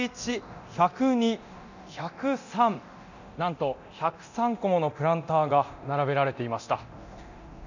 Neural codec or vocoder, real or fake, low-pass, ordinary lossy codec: codec, 24 kHz, 0.9 kbps, DualCodec; fake; 7.2 kHz; none